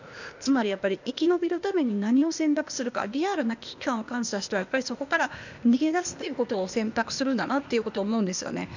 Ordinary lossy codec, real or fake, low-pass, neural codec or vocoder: none; fake; 7.2 kHz; codec, 16 kHz, 0.8 kbps, ZipCodec